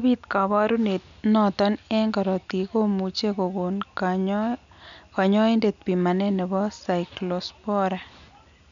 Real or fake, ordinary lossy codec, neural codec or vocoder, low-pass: real; none; none; 7.2 kHz